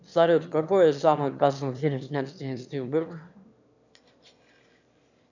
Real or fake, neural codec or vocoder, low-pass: fake; autoencoder, 22.05 kHz, a latent of 192 numbers a frame, VITS, trained on one speaker; 7.2 kHz